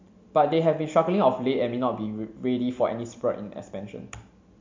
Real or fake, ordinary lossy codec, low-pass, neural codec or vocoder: real; MP3, 48 kbps; 7.2 kHz; none